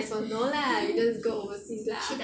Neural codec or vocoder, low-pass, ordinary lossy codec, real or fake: none; none; none; real